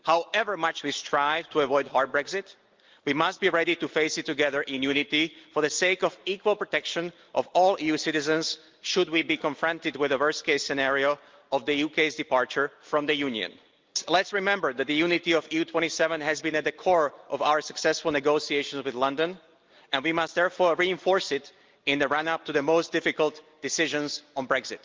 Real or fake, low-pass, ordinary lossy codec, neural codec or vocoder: real; 7.2 kHz; Opus, 32 kbps; none